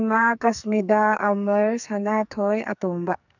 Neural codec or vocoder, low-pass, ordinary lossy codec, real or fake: codec, 44.1 kHz, 2.6 kbps, SNAC; 7.2 kHz; none; fake